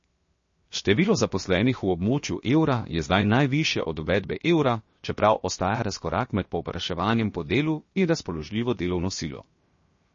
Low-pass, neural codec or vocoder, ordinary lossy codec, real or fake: 7.2 kHz; codec, 16 kHz, 0.7 kbps, FocalCodec; MP3, 32 kbps; fake